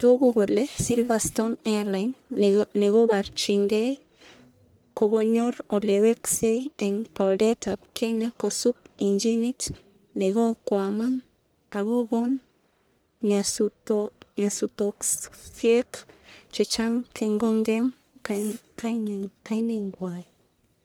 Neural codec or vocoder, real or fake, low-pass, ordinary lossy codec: codec, 44.1 kHz, 1.7 kbps, Pupu-Codec; fake; none; none